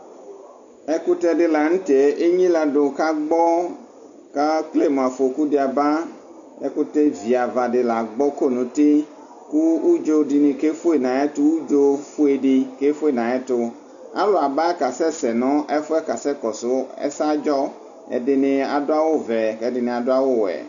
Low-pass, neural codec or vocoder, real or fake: 7.2 kHz; none; real